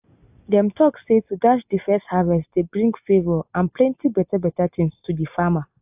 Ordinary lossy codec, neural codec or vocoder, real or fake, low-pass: AAC, 32 kbps; none; real; 3.6 kHz